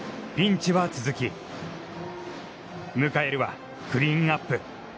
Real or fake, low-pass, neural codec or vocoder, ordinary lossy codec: real; none; none; none